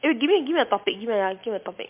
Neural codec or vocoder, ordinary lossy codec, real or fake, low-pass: none; MP3, 32 kbps; real; 3.6 kHz